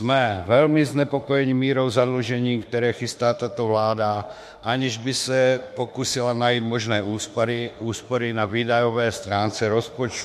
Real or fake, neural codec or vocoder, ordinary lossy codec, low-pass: fake; autoencoder, 48 kHz, 32 numbers a frame, DAC-VAE, trained on Japanese speech; MP3, 64 kbps; 14.4 kHz